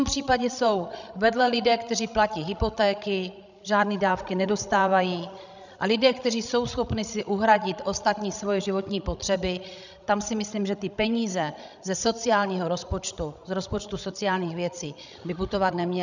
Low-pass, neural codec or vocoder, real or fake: 7.2 kHz; codec, 16 kHz, 16 kbps, FreqCodec, larger model; fake